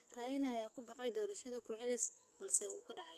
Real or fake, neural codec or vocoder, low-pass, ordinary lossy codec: fake; codec, 44.1 kHz, 2.6 kbps, SNAC; 14.4 kHz; none